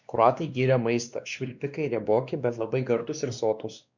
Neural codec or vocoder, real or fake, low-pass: codec, 16 kHz, 2 kbps, X-Codec, WavLM features, trained on Multilingual LibriSpeech; fake; 7.2 kHz